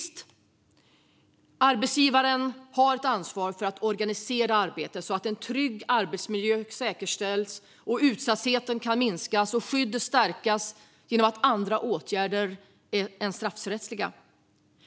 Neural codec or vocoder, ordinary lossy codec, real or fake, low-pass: none; none; real; none